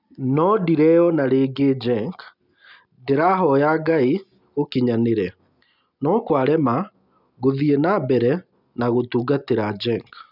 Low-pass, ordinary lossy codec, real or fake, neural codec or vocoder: 5.4 kHz; none; real; none